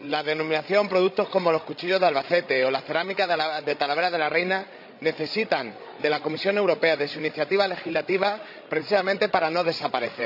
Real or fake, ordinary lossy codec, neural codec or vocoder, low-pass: fake; none; codec, 16 kHz, 16 kbps, FreqCodec, larger model; 5.4 kHz